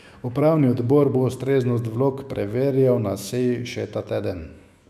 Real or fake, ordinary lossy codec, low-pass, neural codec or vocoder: fake; none; 14.4 kHz; autoencoder, 48 kHz, 128 numbers a frame, DAC-VAE, trained on Japanese speech